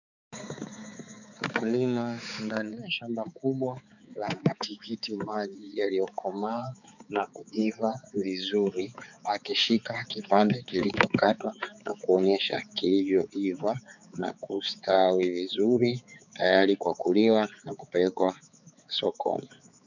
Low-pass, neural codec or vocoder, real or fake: 7.2 kHz; codec, 16 kHz, 4 kbps, X-Codec, HuBERT features, trained on balanced general audio; fake